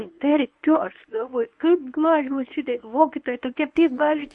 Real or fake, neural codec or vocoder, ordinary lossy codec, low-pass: fake; codec, 24 kHz, 0.9 kbps, WavTokenizer, medium speech release version 1; MP3, 48 kbps; 10.8 kHz